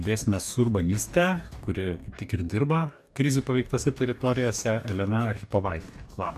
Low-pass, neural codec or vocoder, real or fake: 14.4 kHz; codec, 44.1 kHz, 2.6 kbps, DAC; fake